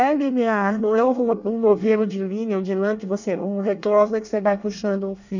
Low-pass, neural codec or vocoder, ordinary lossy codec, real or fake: 7.2 kHz; codec, 24 kHz, 1 kbps, SNAC; none; fake